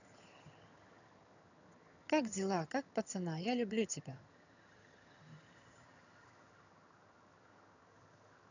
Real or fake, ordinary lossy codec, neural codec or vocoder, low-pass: fake; none; vocoder, 22.05 kHz, 80 mel bands, HiFi-GAN; 7.2 kHz